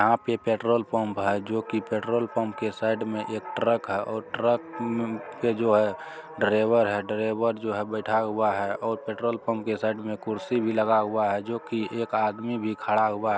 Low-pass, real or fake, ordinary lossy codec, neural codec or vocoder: none; real; none; none